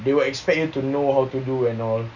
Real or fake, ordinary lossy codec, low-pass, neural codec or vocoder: real; none; 7.2 kHz; none